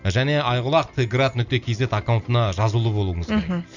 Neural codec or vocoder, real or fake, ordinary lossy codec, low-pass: none; real; none; 7.2 kHz